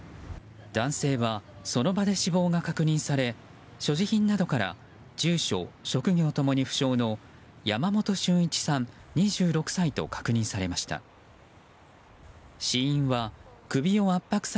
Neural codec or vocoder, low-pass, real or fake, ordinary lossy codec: none; none; real; none